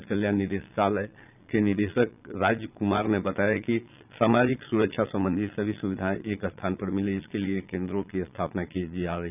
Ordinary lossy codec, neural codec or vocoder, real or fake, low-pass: none; vocoder, 22.05 kHz, 80 mel bands, Vocos; fake; 3.6 kHz